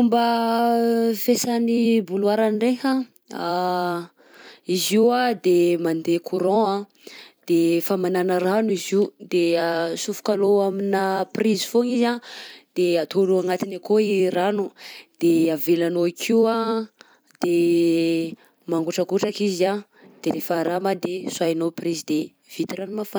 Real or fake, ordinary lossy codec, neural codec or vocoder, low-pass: fake; none; vocoder, 44.1 kHz, 128 mel bands every 256 samples, BigVGAN v2; none